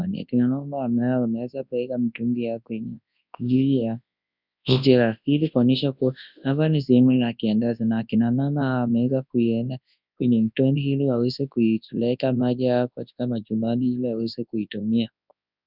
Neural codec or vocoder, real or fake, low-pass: codec, 24 kHz, 0.9 kbps, WavTokenizer, large speech release; fake; 5.4 kHz